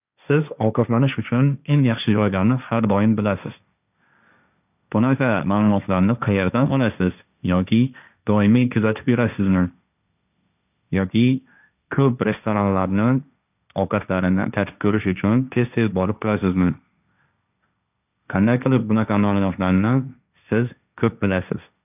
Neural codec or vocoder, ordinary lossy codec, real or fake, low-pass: codec, 16 kHz, 1.1 kbps, Voila-Tokenizer; none; fake; 3.6 kHz